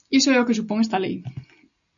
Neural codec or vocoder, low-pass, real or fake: none; 7.2 kHz; real